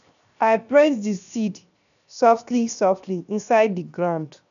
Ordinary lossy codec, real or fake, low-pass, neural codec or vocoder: none; fake; 7.2 kHz; codec, 16 kHz, 0.7 kbps, FocalCodec